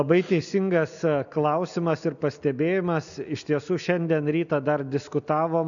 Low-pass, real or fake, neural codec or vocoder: 7.2 kHz; real; none